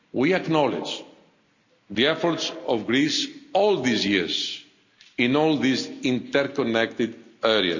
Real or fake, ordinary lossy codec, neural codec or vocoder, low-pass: real; none; none; 7.2 kHz